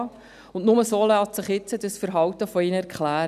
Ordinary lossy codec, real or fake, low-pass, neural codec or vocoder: none; real; 14.4 kHz; none